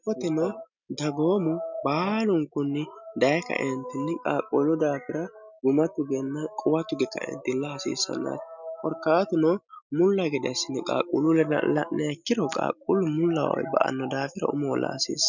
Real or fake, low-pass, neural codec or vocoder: real; 7.2 kHz; none